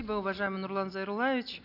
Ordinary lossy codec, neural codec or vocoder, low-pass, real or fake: none; none; 5.4 kHz; real